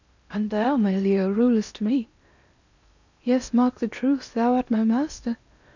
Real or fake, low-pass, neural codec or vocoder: fake; 7.2 kHz; codec, 16 kHz in and 24 kHz out, 0.8 kbps, FocalCodec, streaming, 65536 codes